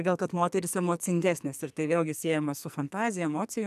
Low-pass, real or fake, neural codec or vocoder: 14.4 kHz; fake; codec, 44.1 kHz, 2.6 kbps, SNAC